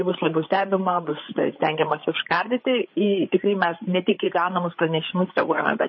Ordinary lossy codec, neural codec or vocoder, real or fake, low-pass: MP3, 24 kbps; codec, 16 kHz, 8 kbps, FreqCodec, larger model; fake; 7.2 kHz